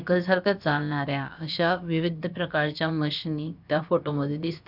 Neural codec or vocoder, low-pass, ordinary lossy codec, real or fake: codec, 16 kHz, about 1 kbps, DyCAST, with the encoder's durations; 5.4 kHz; none; fake